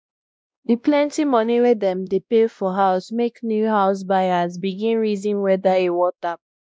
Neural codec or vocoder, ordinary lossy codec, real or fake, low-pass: codec, 16 kHz, 1 kbps, X-Codec, WavLM features, trained on Multilingual LibriSpeech; none; fake; none